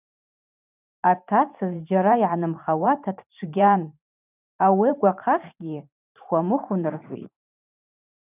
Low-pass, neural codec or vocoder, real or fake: 3.6 kHz; vocoder, 44.1 kHz, 128 mel bands every 512 samples, BigVGAN v2; fake